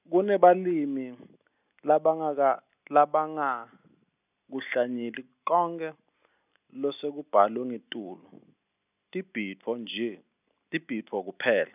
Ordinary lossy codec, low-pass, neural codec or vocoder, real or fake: none; 3.6 kHz; none; real